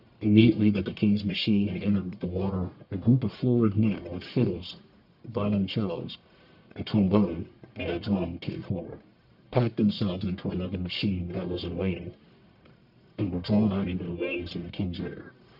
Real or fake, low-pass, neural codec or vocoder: fake; 5.4 kHz; codec, 44.1 kHz, 1.7 kbps, Pupu-Codec